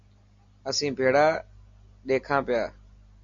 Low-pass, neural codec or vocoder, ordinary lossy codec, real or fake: 7.2 kHz; none; MP3, 48 kbps; real